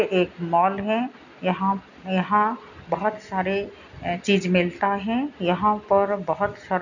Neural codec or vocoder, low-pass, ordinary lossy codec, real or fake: none; 7.2 kHz; none; real